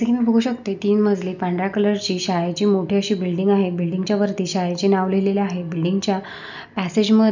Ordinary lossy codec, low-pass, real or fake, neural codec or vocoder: none; 7.2 kHz; real; none